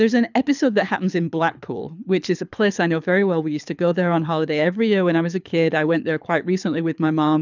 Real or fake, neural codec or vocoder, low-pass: fake; codec, 24 kHz, 6 kbps, HILCodec; 7.2 kHz